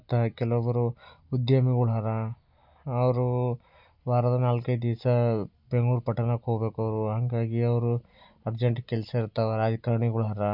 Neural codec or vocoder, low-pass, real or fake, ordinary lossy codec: none; 5.4 kHz; real; none